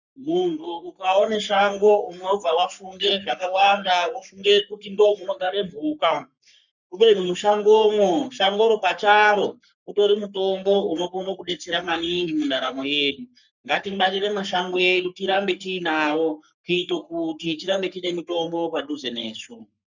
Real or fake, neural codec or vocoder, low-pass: fake; codec, 44.1 kHz, 3.4 kbps, Pupu-Codec; 7.2 kHz